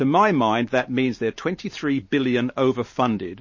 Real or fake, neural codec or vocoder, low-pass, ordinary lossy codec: fake; vocoder, 44.1 kHz, 128 mel bands every 512 samples, BigVGAN v2; 7.2 kHz; MP3, 32 kbps